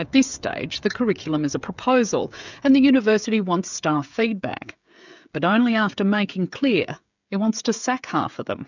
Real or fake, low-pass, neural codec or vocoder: fake; 7.2 kHz; codec, 16 kHz, 16 kbps, FreqCodec, smaller model